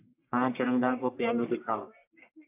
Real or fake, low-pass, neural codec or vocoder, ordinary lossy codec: fake; 3.6 kHz; codec, 44.1 kHz, 1.7 kbps, Pupu-Codec; AAC, 32 kbps